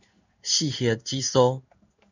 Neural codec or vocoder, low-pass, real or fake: codec, 16 kHz in and 24 kHz out, 1 kbps, XY-Tokenizer; 7.2 kHz; fake